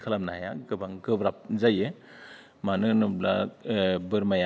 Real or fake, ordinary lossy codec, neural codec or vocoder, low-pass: real; none; none; none